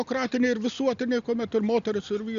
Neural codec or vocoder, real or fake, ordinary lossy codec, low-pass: none; real; Opus, 64 kbps; 7.2 kHz